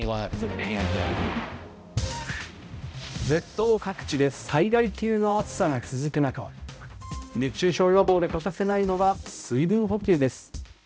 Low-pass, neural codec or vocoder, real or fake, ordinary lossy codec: none; codec, 16 kHz, 0.5 kbps, X-Codec, HuBERT features, trained on balanced general audio; fake; none